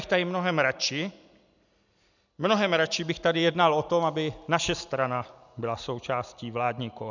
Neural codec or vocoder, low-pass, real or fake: none; 7.2 kHz; real